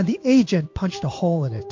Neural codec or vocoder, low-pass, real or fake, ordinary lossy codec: codec, 16 kHz in and 24 kHz out, 1 kbps, XY-Tokenizer; 7.2 kHz; fake; MP3, 48 kbps